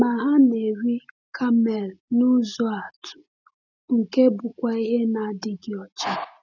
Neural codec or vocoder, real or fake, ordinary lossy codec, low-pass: none; real; none; 7.2 kHz